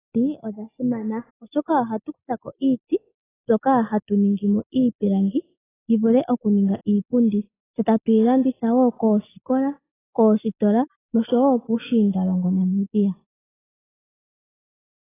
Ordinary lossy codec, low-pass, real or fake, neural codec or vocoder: AAC, 16 kbps; 3.6 kHz; real; none